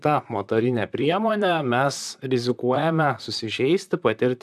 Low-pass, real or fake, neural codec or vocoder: 14.4 kHz; fake; vocoder, 44.1 kHz, 128 mel bands, Pupu-Vocoder